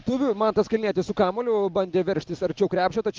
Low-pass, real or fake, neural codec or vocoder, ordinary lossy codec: 7.2 kHz; real; none; Opus, 32 kbps